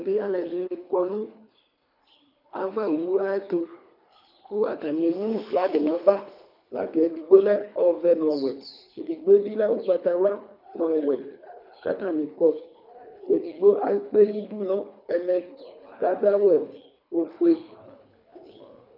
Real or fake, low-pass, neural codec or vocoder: fake; 5.4 kHz; codec, 24 kHz, 3 kbps, HILCodec